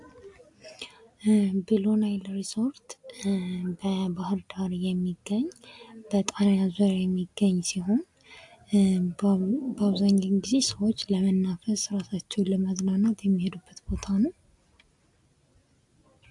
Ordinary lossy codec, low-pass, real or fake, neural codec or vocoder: AAC, 64 kbps; 10.8 kHz; real; none